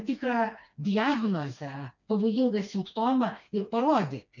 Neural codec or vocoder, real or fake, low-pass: codec, 16 kHz, 2 kbps, FreqCodec, smaller model; fake; 7.2 kHz